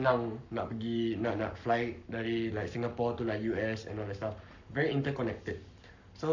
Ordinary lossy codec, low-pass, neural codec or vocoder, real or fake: none; 7.2 kHz; codec, 44.1 kHz, 7.8 kbps, Pupu-Codec; fake